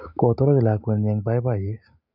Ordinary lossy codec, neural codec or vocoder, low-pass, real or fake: none; none; 5.4 kHz; real